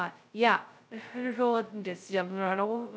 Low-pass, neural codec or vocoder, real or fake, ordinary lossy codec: none; codec, 16 kHz, 0.2 kbps, FocalCodec; fake; none